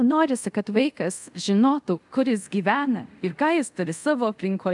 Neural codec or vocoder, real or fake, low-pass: codec, 24 kHz, 0.5 kbps, DualCodec; fake; 10.8 kHz